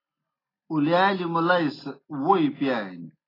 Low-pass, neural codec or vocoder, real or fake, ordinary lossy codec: 5.4 kHz; none; real; AAC, 24 kbps